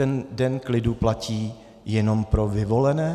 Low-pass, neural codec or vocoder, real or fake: 14.4 kHz; none; real